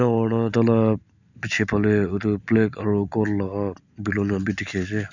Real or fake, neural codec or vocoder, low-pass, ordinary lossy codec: real; none; 7.2 kHz; none